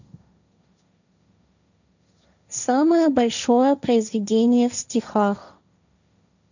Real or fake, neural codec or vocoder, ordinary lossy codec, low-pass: fake; codec, 16 kHz, 1.1 kbps, Voila-Tokenizer; none; 7.2 kHz